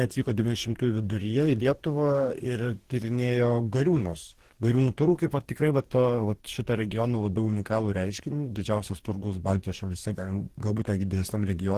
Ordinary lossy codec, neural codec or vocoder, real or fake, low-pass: Opus, 16 kbps; codec, 44.1 kHz, 2.6 kbps, DAC; fake; 14.4 kHz